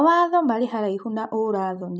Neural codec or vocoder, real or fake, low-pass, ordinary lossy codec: none; real; none; none